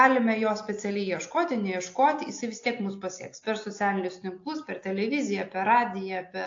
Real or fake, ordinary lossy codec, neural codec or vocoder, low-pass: real; AAC, 48 kbps; none; 7.2 kHz